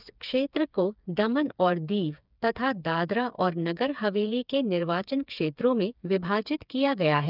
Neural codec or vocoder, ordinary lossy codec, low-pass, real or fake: codec, 16 kHz, 4 kbps, FreqCodec, smaller model; none; 5.4 kHz; fake